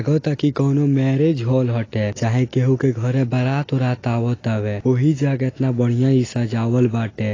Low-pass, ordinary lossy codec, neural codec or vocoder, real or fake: 7.2 kHz; AAC, 32 kbps; none; real